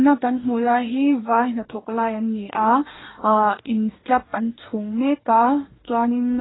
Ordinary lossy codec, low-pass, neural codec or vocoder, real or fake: AAC, 16 kbps; 7.2 kHz; codec, 44.1 kHz, 2.6 kbps, DAC; fake